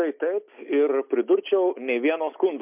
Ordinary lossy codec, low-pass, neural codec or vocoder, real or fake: MP3, 32 kbps; 3.6 kHz; none; real